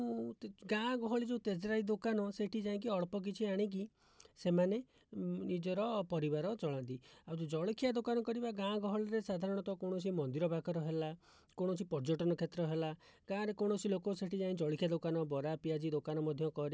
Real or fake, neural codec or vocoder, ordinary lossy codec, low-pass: real; none; none; none